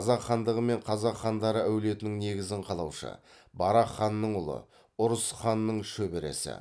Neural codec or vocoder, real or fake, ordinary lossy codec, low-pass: none; real; none; none